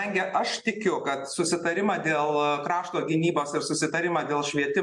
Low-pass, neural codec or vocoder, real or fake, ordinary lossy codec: 10.8 kHz; none; real; MP3, 64 kbps